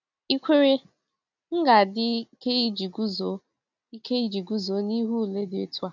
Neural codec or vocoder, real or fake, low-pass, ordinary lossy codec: none; real; 7.2 kHz; none